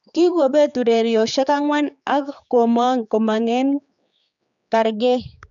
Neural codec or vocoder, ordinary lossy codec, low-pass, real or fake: codec, 16 kHz, 4 kbps, X-Codec, HuBERT features, trained on general audio; none; 7.2 kHz; fake